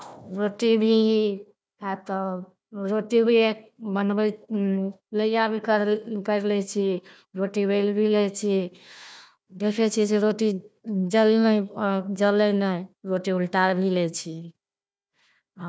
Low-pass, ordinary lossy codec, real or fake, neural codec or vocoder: none; none; fake; codec, 16 kHz, 1 kbps, FunCodec, trained on Chinese and English, 50 frames a second